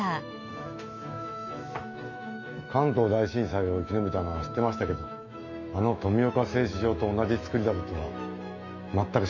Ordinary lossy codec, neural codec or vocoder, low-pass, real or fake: none; autoencoder, 48 kHz, 128 numbers a frame, DAC-VAE, trained on Japanese speech; 7.2 kHz; fake